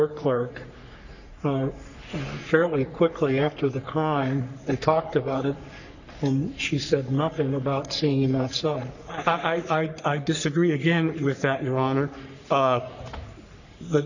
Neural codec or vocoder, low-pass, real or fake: codec, 44.1 kHz, 3.4 kbps, Pupu-Codec; 7.2 kHz; fake